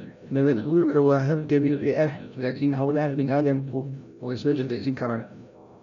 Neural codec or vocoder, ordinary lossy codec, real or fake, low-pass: codec, 16 kHz, 0.5 kbps, FreqCodec, larger model; MP3, 64 kbps; fake; 7.2 kHz